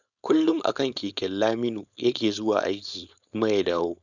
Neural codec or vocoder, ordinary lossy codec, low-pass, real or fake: codec, 16 kHz, 4.8 kbps, FACodec; none; 7.2 kHz; fake